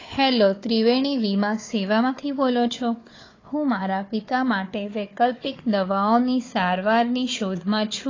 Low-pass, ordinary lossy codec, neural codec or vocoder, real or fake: 7.2 kHz; AAC, 32 kbps; codec, 16 kHz, 4 kbps, FunCodec, trained on Chinese and English, 50 frames a second; fake